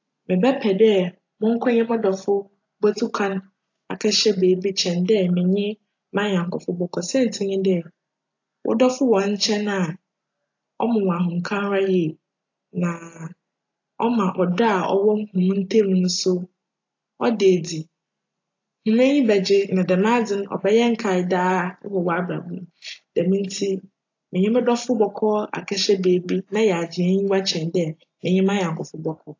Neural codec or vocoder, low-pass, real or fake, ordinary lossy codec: none; 7.2 kHz; real; AAC, 48 kbps